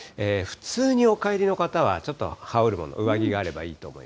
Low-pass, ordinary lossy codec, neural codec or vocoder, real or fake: none; none; none; real